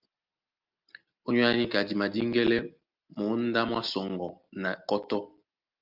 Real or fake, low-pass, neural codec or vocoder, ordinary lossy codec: real; 5.4 kHz; none; Opus, 24 kbps